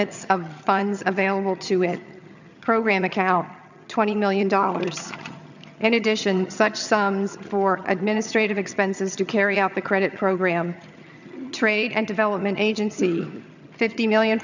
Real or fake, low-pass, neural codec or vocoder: fake; 7.2 kHz; vocoder, 22.05 kHz, 80 mel bands, HiFi-GAN